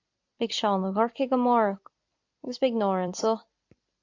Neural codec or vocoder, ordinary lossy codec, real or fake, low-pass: vocoder, 44.1 kHz, 128 mel bands every 256 samples, BigVGAN v2; AAC, 48 kbps; fake; 7.2 kHz